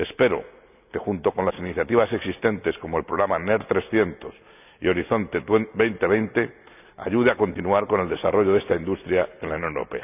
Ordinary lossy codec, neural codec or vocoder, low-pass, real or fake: none; none; 3.6 kHz; real